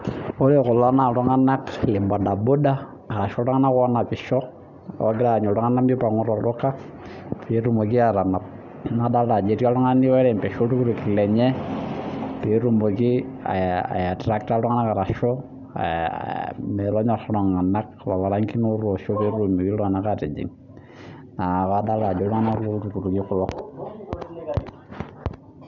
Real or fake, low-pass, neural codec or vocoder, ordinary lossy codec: real; 7.2 kHz; none; none